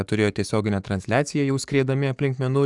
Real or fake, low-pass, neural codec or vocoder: fake; 10.8 kHz; codec, 44.1 kHz, 7.8 kbps, DAC